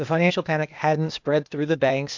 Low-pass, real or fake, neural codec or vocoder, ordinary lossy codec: 7.2 kHz; fake; codec, 16 kHz, 0.8 kbps, ZipCodec; MP3, 64 kbps